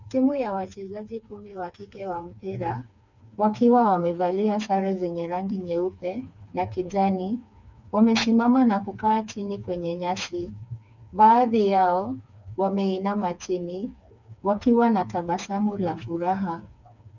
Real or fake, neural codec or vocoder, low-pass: fake; codec, 16 kHz, 4 kbps, FreqCodec, smaller model; 7.2 kHz